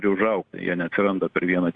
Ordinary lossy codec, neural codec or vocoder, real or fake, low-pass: Opus, 64 kbps; none; real; 9.9 kHz